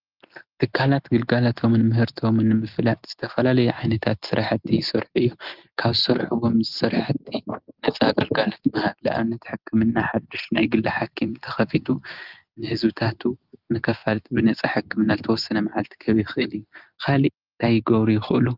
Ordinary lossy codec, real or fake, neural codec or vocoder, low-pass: Opus, 32 kbps; real; none; 5.4 kHz